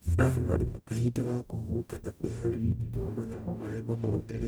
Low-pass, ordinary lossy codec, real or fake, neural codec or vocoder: none; none; fake; codec, 44.1 kHz, 0.9 kbps, DAC